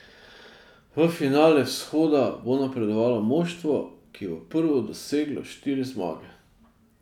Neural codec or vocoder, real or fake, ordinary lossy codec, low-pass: none; real; none; 19.8 kHz